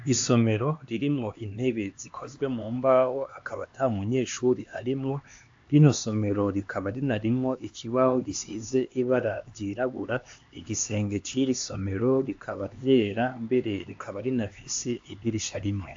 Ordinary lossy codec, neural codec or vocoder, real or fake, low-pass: AAC, 48 kbps; codec, 16 kHz, 2 kbps, X-Codec, HuBERT features, trained on LibriSpeech; fake; 7.2 kHz